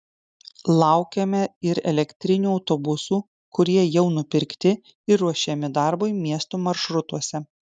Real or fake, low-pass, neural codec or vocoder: real; 9.9 kHz; none